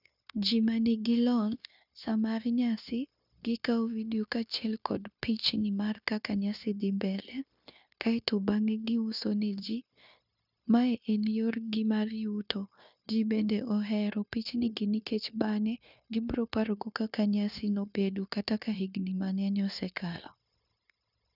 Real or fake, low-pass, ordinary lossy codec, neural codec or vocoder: fake; 5.4 kHz; none; codec, 16 kHz, 0.9 kbps, LongCat-Audio-Codec